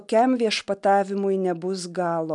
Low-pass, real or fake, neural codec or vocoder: 10.8 kHz; real; none